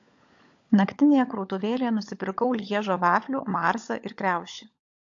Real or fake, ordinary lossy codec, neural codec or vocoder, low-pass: fake; AAC, 48 kbps; codec, 16 kHz, 16 kbps, FunCodec, trained on LibriTTS, 50 frames a second; 7.2 kHz